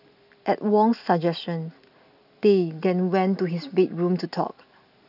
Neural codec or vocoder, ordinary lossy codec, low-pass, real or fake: none; none; 5.4 kHz; real